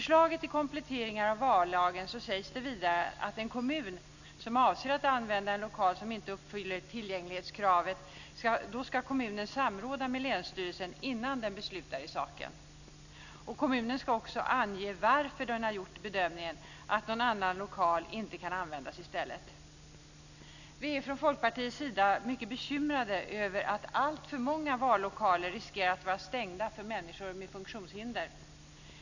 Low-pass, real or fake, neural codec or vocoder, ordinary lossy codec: 7.2 kHz; real; none; none